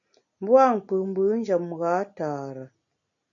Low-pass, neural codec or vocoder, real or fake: 7.2 kHz; none; real